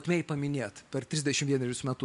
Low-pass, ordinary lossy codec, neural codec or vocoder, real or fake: 10.8 kHz; MP3, 64 kbps; none; real